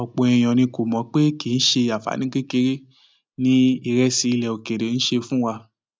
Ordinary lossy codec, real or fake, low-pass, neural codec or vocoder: none; real; none; none